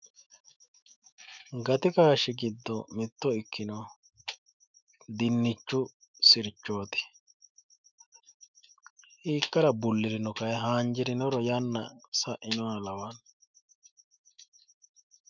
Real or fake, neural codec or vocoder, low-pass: fake; autoencoder, 48 kHz, 128 numbers a frame, DAC-VAE, trained on Japanese speech; 7.2 kHz